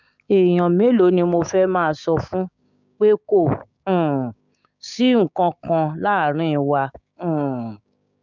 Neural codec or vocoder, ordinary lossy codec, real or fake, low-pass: codec, 16 kHz, 6 kbps, DAC; none; fake; 7.2 kHz